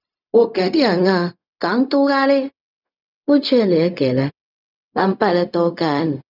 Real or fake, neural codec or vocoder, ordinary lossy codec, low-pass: fake; codec, 16 kHz, 0.4 kbps, LongCat-Audio-Codec; none; 5.4 kHz